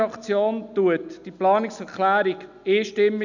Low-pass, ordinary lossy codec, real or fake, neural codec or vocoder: 7.2 kHz; none; real; none